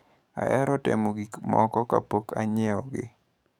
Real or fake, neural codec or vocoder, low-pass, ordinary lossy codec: fake; codec, 44.1 kHz, 7.8 kbps, DAC; 19.8 kHz; none